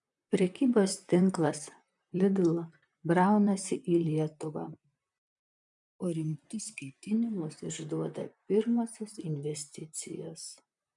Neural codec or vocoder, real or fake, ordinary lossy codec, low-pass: vocoder, 44.1 kHz, 128 mel bands, Pupu-Vocoder; fake; MP3, 96 kbps; 10.8 kHz